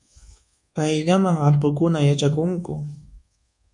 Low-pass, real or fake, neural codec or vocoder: 10.8 kHz; fake; codec, 24 kHz, 1.2 kbps, DualCodec